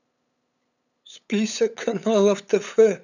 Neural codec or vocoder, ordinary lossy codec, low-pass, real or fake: codec, 16 kHz, 8 kbps, FunCodec, trained on LibriTTS, 25 frames a second; none; 7.2 kHz; fake